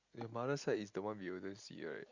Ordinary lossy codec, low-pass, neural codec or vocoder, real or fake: Opus, 64 kbps; 7.2 kHz; none; real